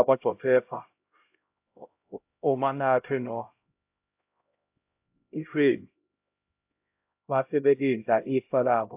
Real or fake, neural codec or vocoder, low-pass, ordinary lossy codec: fake; codec, 16 kHz, 0.5 kbps, X-Codec, HuBERT features, trained on LibriSpeech; 3.6 kHz; AAC, 32 kbps